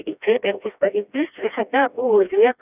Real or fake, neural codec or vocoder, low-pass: fake; codec, 16 kHz, 1 kbps, FreqCodec, smaller model; 3.6 kHz